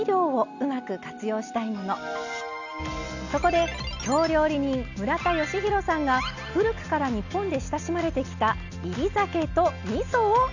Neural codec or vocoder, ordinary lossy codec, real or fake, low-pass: none; none; real; 7.2 kHz